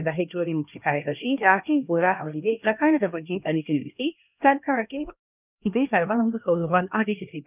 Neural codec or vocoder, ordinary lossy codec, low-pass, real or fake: codec, 16 kHz, 1 kbps, X-Codec, HuBERT features, trained on LibriSpeech; none; 3.6 kHz; fake